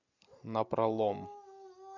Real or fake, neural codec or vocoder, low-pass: real; none; 7.2 kHz